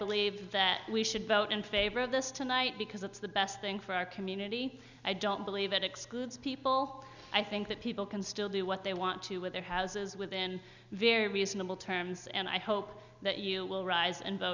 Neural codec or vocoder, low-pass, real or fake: none; 7.2 kHz; real